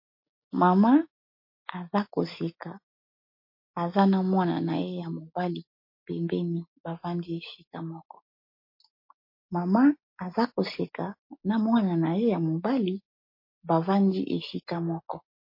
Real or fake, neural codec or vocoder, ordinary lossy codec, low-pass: real; none; MP3, 32 kbps; 5.4 kHz